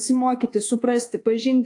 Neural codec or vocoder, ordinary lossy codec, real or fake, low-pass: codec, 24 kHz, 1.2 kbps, DualCodec; AAC, 48 kbps; fake; 10.8 kHz